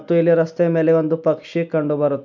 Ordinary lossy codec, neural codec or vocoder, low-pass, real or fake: none; none; 7.2 kHz; real